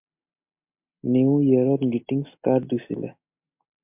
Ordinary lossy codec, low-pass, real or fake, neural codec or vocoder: MP3, 32 kbps; 3.6 kHz; real; none